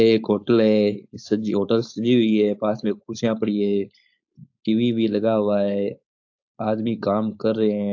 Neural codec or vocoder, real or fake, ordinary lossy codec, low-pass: codec, 16 kHz, 4.8 kbps, FACodec; fake; AAC, 48 kbps; 7.2 kHz